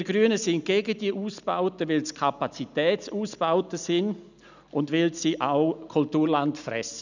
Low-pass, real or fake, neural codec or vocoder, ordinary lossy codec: 7.2 kHz; real; none; none